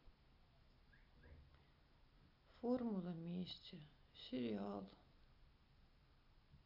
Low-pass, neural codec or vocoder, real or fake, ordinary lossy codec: 5.4 kHz; none; real; none